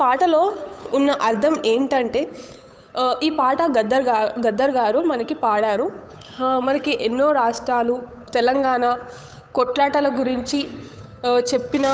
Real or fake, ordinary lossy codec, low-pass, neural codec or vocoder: fake; none; none; codec, 16 kHz, 8 kbps, FunCodec, trained on Chinese and English, 25 frames a second